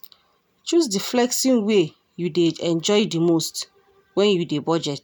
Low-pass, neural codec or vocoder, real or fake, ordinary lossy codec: none; none; real; none